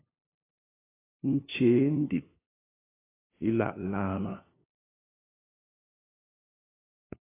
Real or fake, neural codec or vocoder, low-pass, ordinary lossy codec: fake; codec, 16 kHz, 2 kbps, FunCodec, trained on LibriTTS, 25 frames a second; 3.6 kHz; AAC, 16 kbps